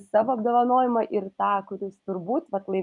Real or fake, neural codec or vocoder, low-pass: real; none; 10.8 kHz